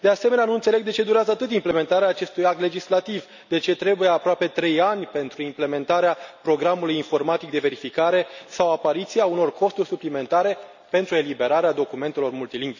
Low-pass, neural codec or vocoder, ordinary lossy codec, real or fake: 7.2 kHz; none; none; real